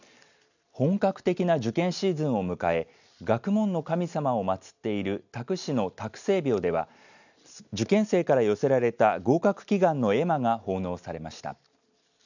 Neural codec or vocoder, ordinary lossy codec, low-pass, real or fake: none; none; 7.2 kHz; real